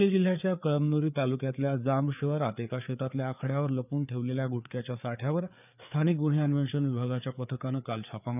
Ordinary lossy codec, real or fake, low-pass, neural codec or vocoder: none; fake; 3.6 kHz; codec, 16 kHz, 4 kbps, FreqCodec, larger model